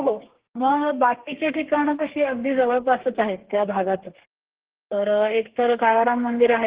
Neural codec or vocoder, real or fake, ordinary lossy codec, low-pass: codec, 32 kHz, 1.9 kbps, SNAC; fake; Opus, 16 kbps; 3.6 kHz